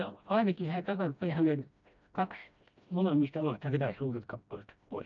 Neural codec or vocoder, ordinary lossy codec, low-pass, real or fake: codec, 16 kHz, 1 kbps, FreqCodec, smaller model; none; 7.2 kHz; fake